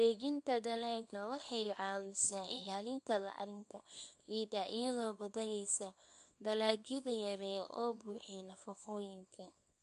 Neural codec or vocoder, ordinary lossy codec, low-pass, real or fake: codec, 24 kHz, 0.9 kbps, WavTokenizer, small release; MP3, 64 kbps; 10.8 kHz; fake